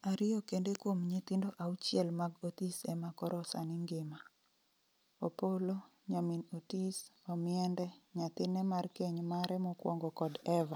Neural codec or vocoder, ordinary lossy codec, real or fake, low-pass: none; none; real; none